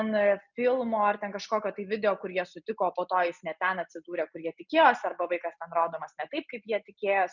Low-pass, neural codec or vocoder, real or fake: 7.2 kHz; none; real